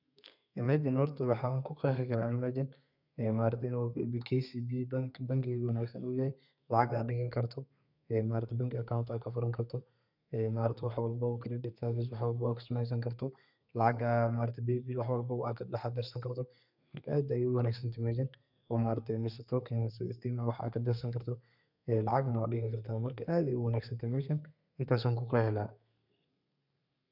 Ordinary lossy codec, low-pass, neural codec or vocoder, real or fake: none; 5.4 kHz; codec, 32 kHz, 1.9 kbps, SNAC; fake